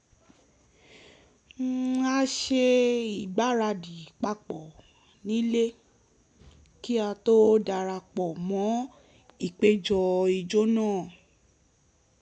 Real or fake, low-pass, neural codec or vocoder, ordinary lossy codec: real; 10.8 kHz; none; none